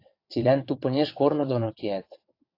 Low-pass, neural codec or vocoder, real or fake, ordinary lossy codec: 5.4 kHz; vocoder, 22.05 kHz, 80 mel bands, WaveNeXt; fake; AAC, 32 kbps